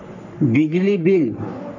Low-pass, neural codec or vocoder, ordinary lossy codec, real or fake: 7.2 kHz; codec, 44.1 kHz, 3.4 kbps, Pupu-Codec; none; fake